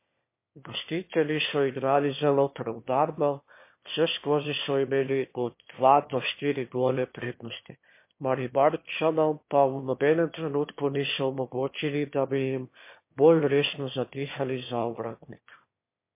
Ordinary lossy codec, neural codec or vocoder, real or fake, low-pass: MP3, 24 kbps; autoencoder, 22.05 kHz, a latent of 192 numbers a frame, VITS, trained on one speaker; fake; 3.6 kHz